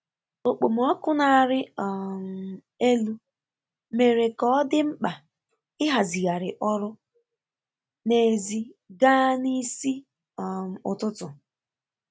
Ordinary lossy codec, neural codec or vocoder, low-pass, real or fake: none; none; none; real